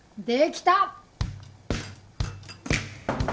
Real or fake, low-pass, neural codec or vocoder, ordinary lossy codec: real; none; none; none